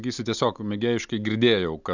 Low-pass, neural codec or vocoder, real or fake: 7.2 kHz; none; real